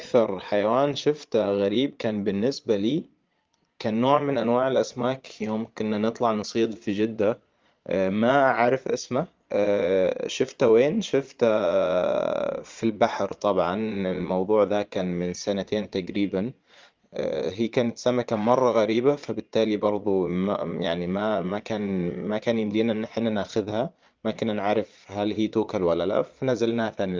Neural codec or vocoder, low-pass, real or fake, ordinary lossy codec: vocoder, 22.05 kHz, 80 mel bands, Vocos; 7.2 kHz; fake; Opus, 32 kbps